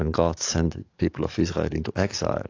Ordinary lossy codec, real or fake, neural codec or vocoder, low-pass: AAC, 48 kbps; fake; codec, 44.1 kHz, 7.8 kbps, DAC; 7.2 kHz